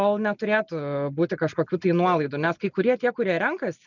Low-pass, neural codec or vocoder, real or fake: 7.2 kHz; none; real